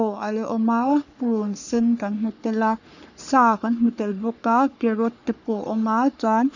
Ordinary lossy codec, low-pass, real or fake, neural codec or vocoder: none; 7.2 kHz; fake; codec, 44.1 kHz, 3.4 kbps, Pupu-Codec